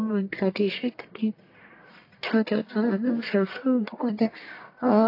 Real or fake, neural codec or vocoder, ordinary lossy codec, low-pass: fake; codec, 44.1 kHz, 1.7 kbps, Pupu-Codec; AAC, 32 kbps; 5.4 kHz